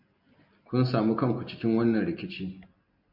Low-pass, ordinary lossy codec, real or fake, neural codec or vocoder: 5.4 kHz; MP3, 48 kbps; real; none